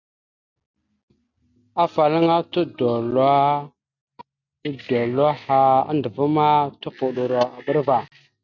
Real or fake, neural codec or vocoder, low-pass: real; none; 7.2 kHz